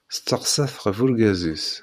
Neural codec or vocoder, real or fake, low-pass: vocoder, 44.1 kHz, 128 mel bands every 512 samples, BigVGAN v2; fake; 14.4 kHz